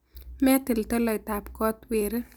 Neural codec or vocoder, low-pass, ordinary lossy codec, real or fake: none; none; none; real